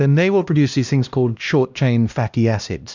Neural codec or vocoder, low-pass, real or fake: codec, 16 kHz, 1 kbps, X-Codec, HuBERT features, trained on LibriSpeech; 7.2 kHz; fake